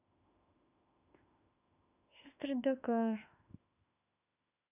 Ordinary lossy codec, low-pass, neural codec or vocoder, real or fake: none; 3.6 kHz; autoencoder, 48 kHz, 32 numbers a frame, DAC-VAE, trained on Japanese speech; fake